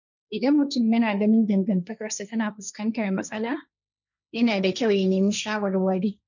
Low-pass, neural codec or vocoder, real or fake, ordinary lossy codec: 7.2 kHz; codec, 16 kHz, 1.1 kbps, Voila-Tokenizer; fake; none